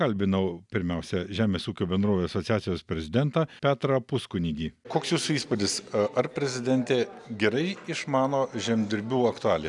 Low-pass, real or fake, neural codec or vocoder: 10.8 kHz; real; none